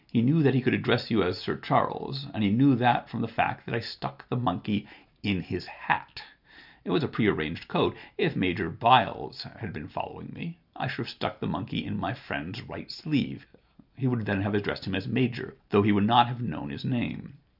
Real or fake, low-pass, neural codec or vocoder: real; 5.4 kHz; none